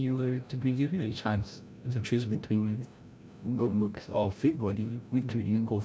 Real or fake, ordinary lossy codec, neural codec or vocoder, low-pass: fake; none; codec, 16 kHz, 0.5 kbps, FreqCodec, larger model; none